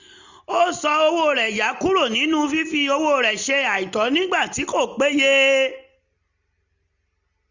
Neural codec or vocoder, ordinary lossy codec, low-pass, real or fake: none; none; 7.2 kHz; real